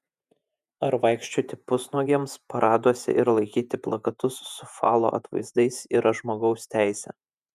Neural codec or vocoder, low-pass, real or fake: vocoder, 48 kHz, 128 mel bands, Vocos; 14.4 kHz; fake